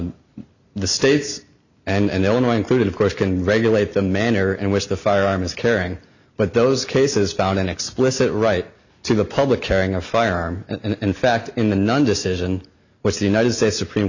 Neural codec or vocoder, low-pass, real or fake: none; 7.2 kHz; real